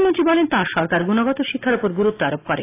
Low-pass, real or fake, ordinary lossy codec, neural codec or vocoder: 3.6 kHz; real; AAC, 24 kbps; none